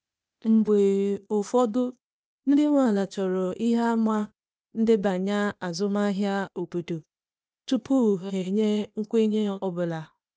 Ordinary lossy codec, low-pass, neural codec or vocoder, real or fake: none; none; codec, 16 kHz, 0.8 kbps, ZipCodec; fake